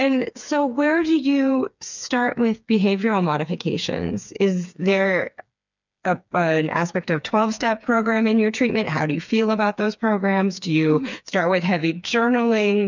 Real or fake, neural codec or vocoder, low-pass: fake; codec, 16 kHz, 4 kbps, FreqCodec, smaller model; 7.2 kHz